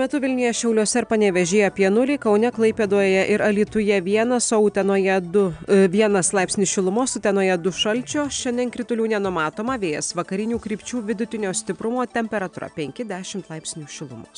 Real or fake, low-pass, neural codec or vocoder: real; 9.9 kHz; none